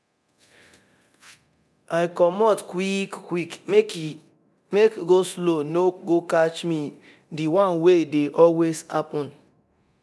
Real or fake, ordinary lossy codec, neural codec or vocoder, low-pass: fake; none; codec, 24 kHz, 0.9 kbps, DualCodec; none